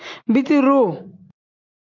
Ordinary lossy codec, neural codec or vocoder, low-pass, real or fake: MP3, 64 kbps; none; 7.2 kHz; real